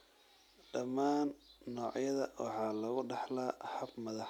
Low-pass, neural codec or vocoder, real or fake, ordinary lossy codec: 19.8 kHz; none; real; none